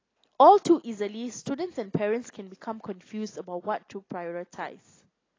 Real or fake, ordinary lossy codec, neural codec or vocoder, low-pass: real; AAC, 32 kbps; none; 7.2 kHz